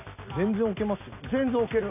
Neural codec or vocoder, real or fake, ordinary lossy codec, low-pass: none; real; none; 3.6 kHz